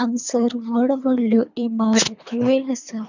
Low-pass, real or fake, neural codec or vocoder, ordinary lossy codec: 7.2 kHz; fake; codec, 24 kHz, 3 kbps, HILCodec; none